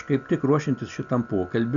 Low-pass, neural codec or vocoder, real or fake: 7.2 kHz; none; real